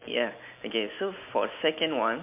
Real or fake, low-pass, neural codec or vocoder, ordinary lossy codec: real; 3.6 kHz; none; MP3, 32 kbps